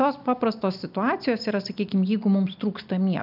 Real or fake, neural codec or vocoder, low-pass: real; none; 5.4 kHz